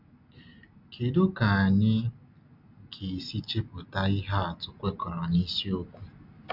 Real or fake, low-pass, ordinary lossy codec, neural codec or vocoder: real; 5.4 kHz; none; none